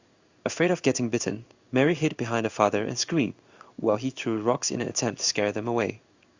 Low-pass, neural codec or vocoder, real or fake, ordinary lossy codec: 7.2 kHz; codec, 16 kHz in and 24 kHz out, 1 kbps, XY-Tokenizer; fake; Opus, 64 kbps